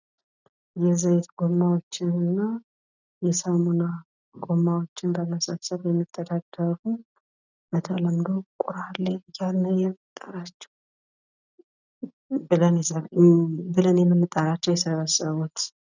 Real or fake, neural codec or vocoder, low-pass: real; none; 7.2 kHz